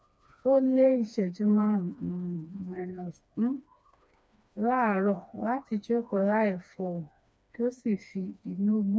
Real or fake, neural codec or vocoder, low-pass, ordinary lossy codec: fake; codec, 16 kHz, 2 kbps, FreqCodec, smaller model; none; none